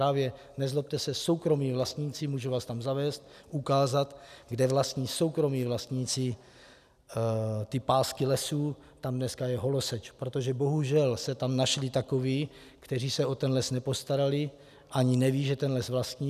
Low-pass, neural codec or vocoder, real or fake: 14.4 kHz; none; real